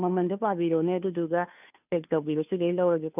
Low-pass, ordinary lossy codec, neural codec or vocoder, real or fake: 3.6 kHz; none; codec, 16 kHz, 2 kbps, FunCodec, trained on Chinese and English, 25 frames a second; fake